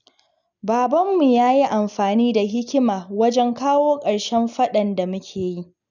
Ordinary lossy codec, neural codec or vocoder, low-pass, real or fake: none; none; 7.2 kHz; real